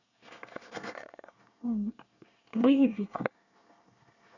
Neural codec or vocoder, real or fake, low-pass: codec, 24 kHz, 1 kbps, SNAC; fake; 7.2 kHz